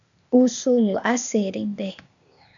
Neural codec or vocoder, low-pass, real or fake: codec, 16 kHz, 0.8 kbps, ZipCodec; 7.2 kHz; fake